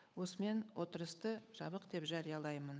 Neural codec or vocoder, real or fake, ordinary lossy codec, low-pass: codec, 16 kHz, 8 kbps, FunCodec, trained on Chinese and English, 25 frames a second; fake; none; none